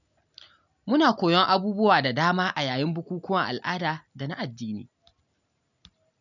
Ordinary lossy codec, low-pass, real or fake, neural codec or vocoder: none; 7.2 kHz; real; none